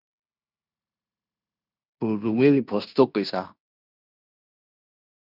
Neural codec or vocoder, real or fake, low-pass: codec, 16 kHz in and 24 kHz out, 0.9 kbps, LongCat-Audio-Codec, fine tuned four codebook decoder; fake; 5.4 kHz